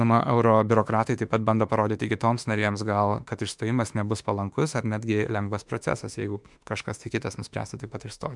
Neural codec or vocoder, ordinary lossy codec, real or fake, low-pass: autoencoder, 48 kHz, 32 numbers a frame, DAC-VAE, trained on Japanese speech; MP3, 96 kbps; fake; 10.8 kHz